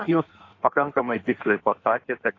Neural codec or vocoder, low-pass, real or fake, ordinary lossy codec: codec, 16 kHz in and 24 kHz out, 1.1 kbps, FireRedTTS-2 codec; 7.2 kHz; fake; AAC, 32 kbps